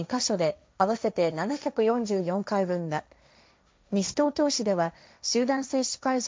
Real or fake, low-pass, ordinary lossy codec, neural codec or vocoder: fake; none; none; codec, 16 kHz, 1.1 kbps, Voila-Tokenizer